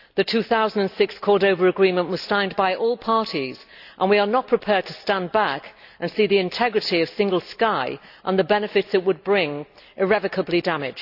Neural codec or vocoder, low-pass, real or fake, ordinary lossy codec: none; 5.4 kHz; real; AAC, 48 kbps